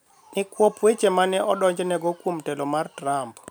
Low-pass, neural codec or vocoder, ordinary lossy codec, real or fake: none; none; none; real